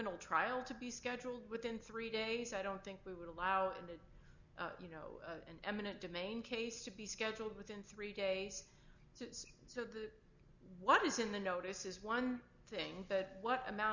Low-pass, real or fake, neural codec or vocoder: 7.2 kHz; real; none